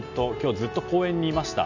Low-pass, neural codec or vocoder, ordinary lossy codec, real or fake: 7.2 kHz; none; none; real